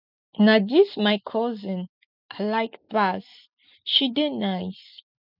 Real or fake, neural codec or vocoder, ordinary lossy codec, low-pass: real; none; none; 5.4 kHz